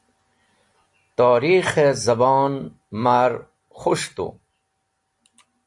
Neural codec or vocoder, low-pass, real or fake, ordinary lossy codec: none; 10.8 kHz; real; AAC, 64 kbps